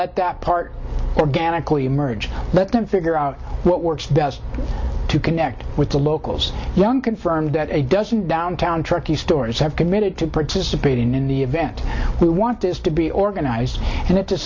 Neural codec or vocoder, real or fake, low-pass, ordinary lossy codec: none; real; 7.2 kHz; MP3, 64 kbps